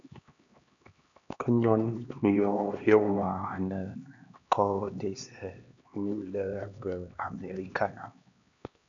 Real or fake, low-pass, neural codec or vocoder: fake; 7.2 kHz; codec, 16 kHz, 2 kbps, X-Codec, HuBERT features, trained on LibriSpeech